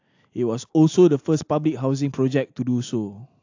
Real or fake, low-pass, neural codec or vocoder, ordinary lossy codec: real; 7.2 kHz; none; AAC, 48 kbps